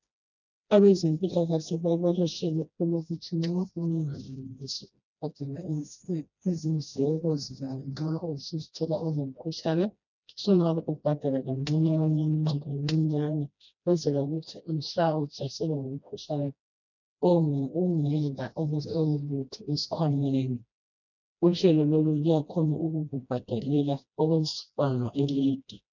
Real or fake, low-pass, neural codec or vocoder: fake; 7.2 kHz; codec, 16 kHz, 1 kbps, FreqCodec, smaller model